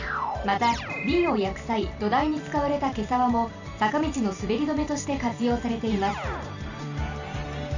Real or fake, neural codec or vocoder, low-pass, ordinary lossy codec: fake; vocoder, 44.1 kHz, 128 mel bands every 512 samples, BigVGAN v2; 7.2 kHz; none